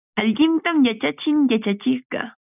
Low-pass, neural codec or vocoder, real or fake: 3.6 kHz; none; real